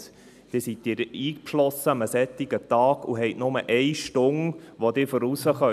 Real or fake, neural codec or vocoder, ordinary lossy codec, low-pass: real; none; none; 14.4 kHz